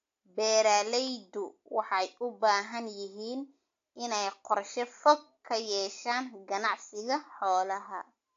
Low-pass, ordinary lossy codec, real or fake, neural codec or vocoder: 7.2 kHz; AAC, 48 kbps; real; none